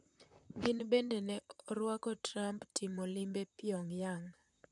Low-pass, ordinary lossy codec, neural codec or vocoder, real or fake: 10.8 kHz; none; vocoder, 44.1 kHz, 128 mel bands, Pupu-Vocoder; fake